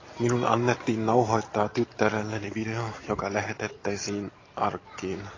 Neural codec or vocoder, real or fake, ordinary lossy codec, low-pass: none; real; AAC, 32 kbps; 7.2 kHz